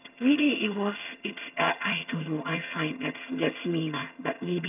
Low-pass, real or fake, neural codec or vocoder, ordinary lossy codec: 3.6 kHz; fake; vocoder, 22.05 kHz, 80 mel bands, HiFi-GAN; none